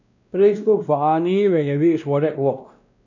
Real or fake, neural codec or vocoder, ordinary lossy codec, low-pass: fake; codec, 16 kHz, 1 kbps, X-Codec, WavLM features, trained on Multilingual LibriSpeech; none; 7.2 kHz